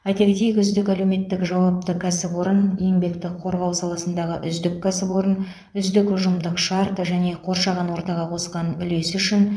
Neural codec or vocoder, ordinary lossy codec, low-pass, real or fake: vocoder, 22.05 kHz, 80 mel bands, Vocos; none; none; fake